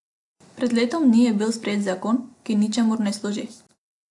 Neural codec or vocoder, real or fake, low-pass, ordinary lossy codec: none; real; 10.8 kHz; AAC, 64 kbps